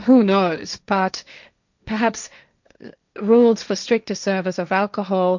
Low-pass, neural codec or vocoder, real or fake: 7.2 kHz; codec, 16 kHz, 1.1 kbps, Voila-Tokenizer; fake